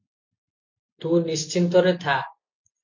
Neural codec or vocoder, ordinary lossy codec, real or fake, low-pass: none; MP3, 48 kbps; real; 7.2 kHz